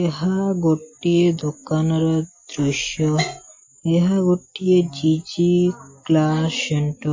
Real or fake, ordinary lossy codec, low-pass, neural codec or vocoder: real; MP3, 32 kbps; 7.2 kHz; none